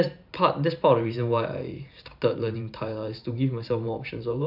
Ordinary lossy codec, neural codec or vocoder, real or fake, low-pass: none; vocoder, 44.1 kHz, 128 mel bands every 512 samples, BigVGAN v2; fake; 5.4 kHz